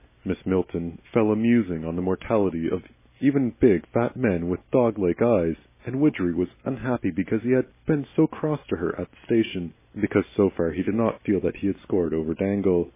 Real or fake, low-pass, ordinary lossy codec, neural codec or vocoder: real; 3.6 kHz; MP3, 16 kbps; none